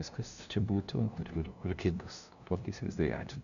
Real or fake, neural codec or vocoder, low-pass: fake; codec, 16 kHz, 0.5 kbps, FunCodec, trained on LibriTTS, 25 frames a second; 7.2 kHz